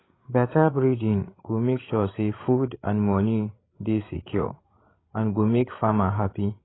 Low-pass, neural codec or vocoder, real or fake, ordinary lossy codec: 7.2 kHz; codec, 16 kHz, 16 kbps, FreqCodec, larger model; fake; AAC, 16 kbps